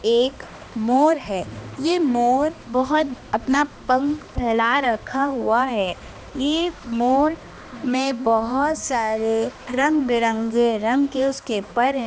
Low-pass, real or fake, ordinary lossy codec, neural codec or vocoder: none; fake; none; codec, 16 kHz, 2 kbps, X-Codec, HuBERT features, trained on balanced general audio